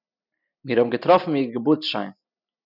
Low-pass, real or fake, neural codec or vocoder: 5.4 kHz; real; none